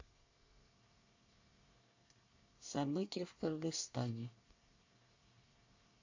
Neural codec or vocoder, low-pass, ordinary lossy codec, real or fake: codec, 24 kHz, 1 kbps, SNAC; 7.2 kHz; AAC, 48 kbps; fake